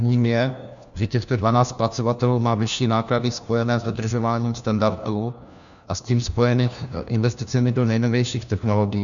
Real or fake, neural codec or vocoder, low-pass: fake; codec, 16 kHz, 1 kbps, FunCodec, trained on LibriTTS, 50 frames a second; 7.2 kHz